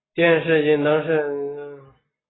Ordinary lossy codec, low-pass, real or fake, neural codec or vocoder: AAC, 16 kbps; 7.2 kHz; real; none